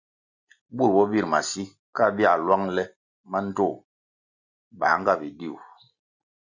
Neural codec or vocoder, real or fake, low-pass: none; real; 7.2 kHz